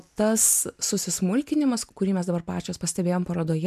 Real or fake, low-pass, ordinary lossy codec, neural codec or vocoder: real; 14.4 kHz; MP3, 96 kbps; none